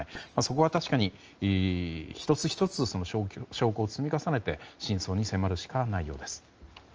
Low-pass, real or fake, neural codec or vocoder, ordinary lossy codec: 7.2 kHz; real; none; Opus, 24 kbps